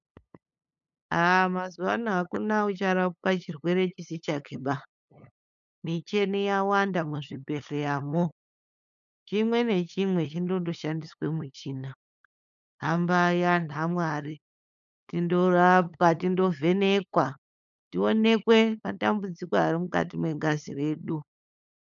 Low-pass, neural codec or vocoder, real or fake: 7.2 kHz; codec, 16 kHz, 8 kbps, FunCodec, trained on LibriTTS, 25 frames a second; fake